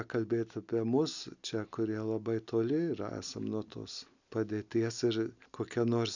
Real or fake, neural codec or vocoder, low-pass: real; none; 7.2 kHz